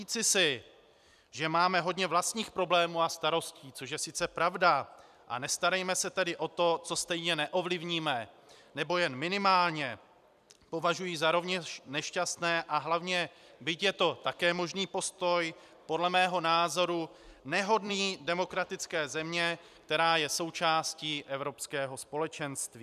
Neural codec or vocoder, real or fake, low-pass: vocoder, 44.1 kHz, 128 mel bands every 256 samples, BigVGAN v2; fake; 14.4 kHz